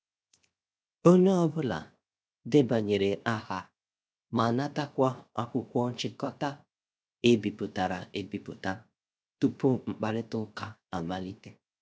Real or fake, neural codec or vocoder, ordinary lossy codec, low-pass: fake; codec, 16 kHz, 0.7 kbps, FocalCodec; none; none